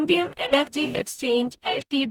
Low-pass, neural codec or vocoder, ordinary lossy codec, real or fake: 19.8 kHz; codec, 44.1 kHz, 0.9 kbps, DAC; none; fake